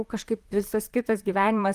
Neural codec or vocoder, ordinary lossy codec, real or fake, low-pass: vocoder, 44.1 kHz, 128 mel bands, Pupu-Vocoder; Opus, 32 kbps; fake; 14.4 kHz